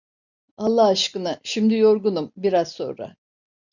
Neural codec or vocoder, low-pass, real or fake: none; 7.2 kHz; real